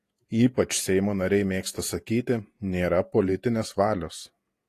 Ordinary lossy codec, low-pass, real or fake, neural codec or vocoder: AAC, 48 kbps; 14.4 kHz; fake; vocoder, 48 kHz, 128 mel bands, Vocos